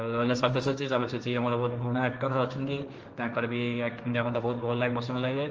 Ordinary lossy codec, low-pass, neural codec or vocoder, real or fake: Opus, 24 kbps; 7.2 kHz; codec, 16 kHz, 1.1 kbps, Voila-Tokenizer; fake